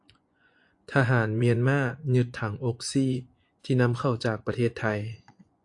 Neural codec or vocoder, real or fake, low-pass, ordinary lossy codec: vocoder, 24 kHz, 100 mel bands, Vocos; fake; 10.8 kHz; AAC, 64 kbps